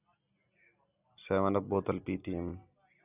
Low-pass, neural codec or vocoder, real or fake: 3.6 kHz; none; real